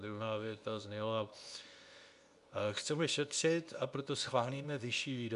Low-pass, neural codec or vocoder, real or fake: 10.8 kHz; codec, 24 kHz, 0.9 kbps, WavTokenizer, medium speech release version 2; fake